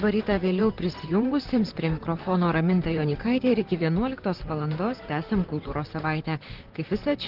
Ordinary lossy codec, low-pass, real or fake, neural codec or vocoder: Opus, 16 kbps; 5.4 kHz; fake; vocoder, 44.1 kHz, 128 mel bands, Pupu-Vocoder